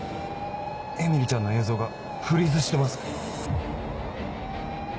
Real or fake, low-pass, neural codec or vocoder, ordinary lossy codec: real; none; none; none